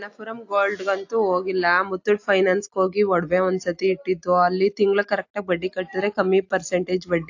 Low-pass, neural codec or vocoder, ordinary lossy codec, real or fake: 7.2 kHz; none; AAC, 48 kbps; real